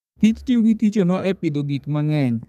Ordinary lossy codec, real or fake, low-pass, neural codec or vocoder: none; fake; 14.4 kHz; codec, 32 kHz, 1.9 kbps, SNAC